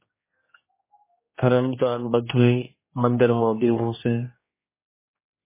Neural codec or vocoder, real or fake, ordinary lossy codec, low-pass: codec, 16 kHz, 1 kbps, X-Codec, HuBERT features, trained on general audio; fake; MP3, 16 kbps; 3.6 kHz